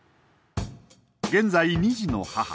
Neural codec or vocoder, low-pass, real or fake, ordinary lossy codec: none; none; real; none